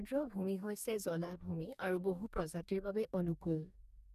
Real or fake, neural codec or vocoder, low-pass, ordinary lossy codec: fake; codec, 44.1 kHz, 2.6 kbps, DAC; 14.4 kHz; none